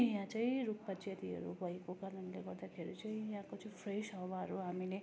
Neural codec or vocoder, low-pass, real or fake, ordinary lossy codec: none; none; real; none